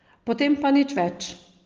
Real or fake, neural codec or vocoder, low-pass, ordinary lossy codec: real; none; 7.2 kHz; Opus, 16 kbps